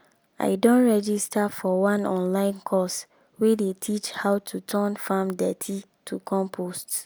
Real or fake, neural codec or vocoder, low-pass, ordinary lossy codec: real; none; none; none